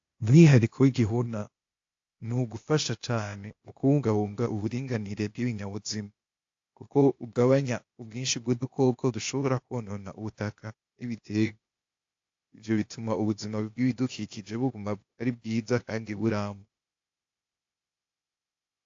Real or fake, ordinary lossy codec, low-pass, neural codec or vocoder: fake; AAC, 48 kbps; 7.2 kHz; codec, 16 kHz, 0.8 kbps, ZipCodec